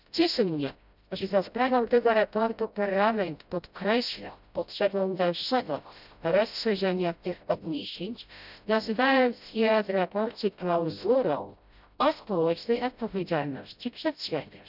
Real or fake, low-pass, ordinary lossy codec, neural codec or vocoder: fake; 5.4 kHz; none; codec, 16 kHz, 0.5 kbps, FreqCodec, smaller model